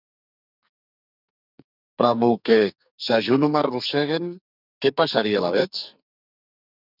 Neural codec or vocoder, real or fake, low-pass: codec, 44.1 kHz, 2.6 kbps, SNAC; fake; 5.4 kHz